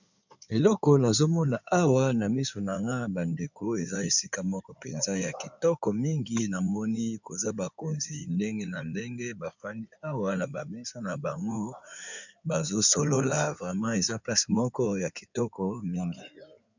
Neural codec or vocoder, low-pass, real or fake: codec, 16 kHz in and 24 kHz out, 2.2 kbps, FireRedTTS-2 codec; 7.2 kHz; fake